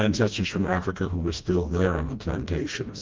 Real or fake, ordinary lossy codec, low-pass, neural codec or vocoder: fake; Opus, 32 kbps; 7.2 kHz; codec, 16 kHz, 1 kbps, FreqCodec, smaller model